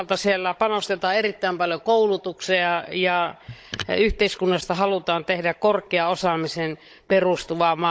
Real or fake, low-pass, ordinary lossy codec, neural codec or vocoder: fake; none; none; codec, 16 kHz, 16 kbps, FunCodec, trained on Chinese and English, 50 frames a second